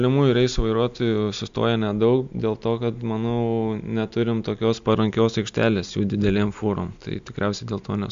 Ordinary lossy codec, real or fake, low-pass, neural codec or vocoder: MP3, 64 kbps; real; 7.2 kHz; none